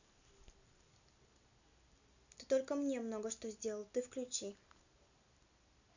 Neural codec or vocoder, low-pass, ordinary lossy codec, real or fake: none; 7.2 kHz; none; real